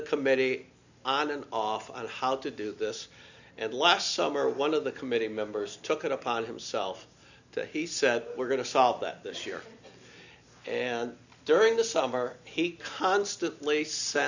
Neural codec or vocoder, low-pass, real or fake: none; 7.2 kHz; real